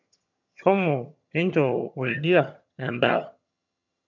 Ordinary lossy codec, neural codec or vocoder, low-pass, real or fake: none; vocoder, 22.05 kHz, 80 mel bands, HiFi-GAN; 7.2 kHz; fake